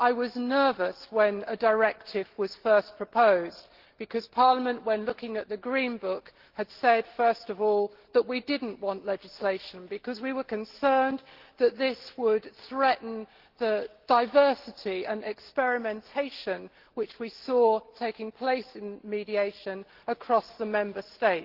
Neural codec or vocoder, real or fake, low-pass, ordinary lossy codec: none; real; 5.4 kHz; Opus, 16 kbps